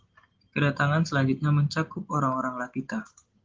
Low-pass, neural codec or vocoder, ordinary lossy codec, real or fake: 7.2 kHz; none; Opus, 16 kbps; real